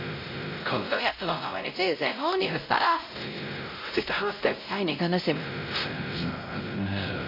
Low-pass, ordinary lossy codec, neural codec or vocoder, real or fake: 5.4 kHz; MP3, 32 kbps; codec, 16 kHz, 0.5 kbps, X-Codec, WavLM features, trained on Multilingual LibriSpeech; fake